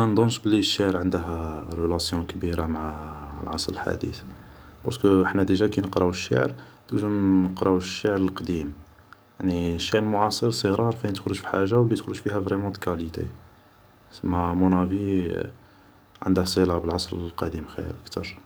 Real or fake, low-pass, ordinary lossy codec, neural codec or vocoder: fake; none; none; codec, 44.1 kHz, 7.8 kbps, DAC